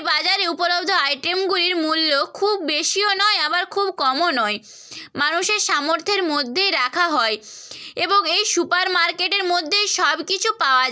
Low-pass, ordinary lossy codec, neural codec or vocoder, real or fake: none; none; none; real